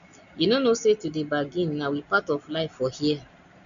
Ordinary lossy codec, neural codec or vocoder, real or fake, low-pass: none; none; real; 7.2 kHz